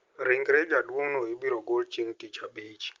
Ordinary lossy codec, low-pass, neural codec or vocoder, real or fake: Opus, 24 kbps; 7.2 kHz; none; real